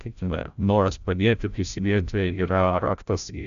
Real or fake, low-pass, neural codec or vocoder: fake; 7.2 kHz; codec, 16 kHz, 0.5 kbps, FreqCodec, larger model